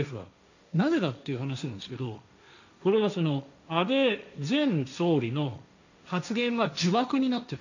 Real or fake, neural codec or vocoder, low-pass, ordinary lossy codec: fake; codec, 16 kHz, 1.1 kbps, Voila-Tokenizer; 7.2 kHz; none